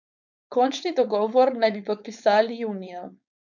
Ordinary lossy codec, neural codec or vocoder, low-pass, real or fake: none; codec, 16 kHz, 4.8 kbps, FACodec; 7.2 kHz; fake